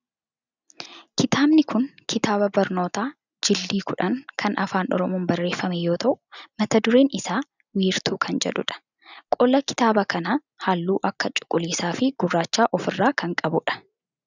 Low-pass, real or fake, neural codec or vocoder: 7.2 kHz; real; none